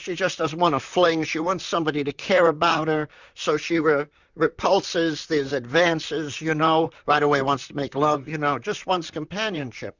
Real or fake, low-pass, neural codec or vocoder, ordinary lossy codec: fake; 7.2 kHz; vocoder, 44.1 kHz, 128 mel bands, Pupu-Vocoder; Opus, 64 kbps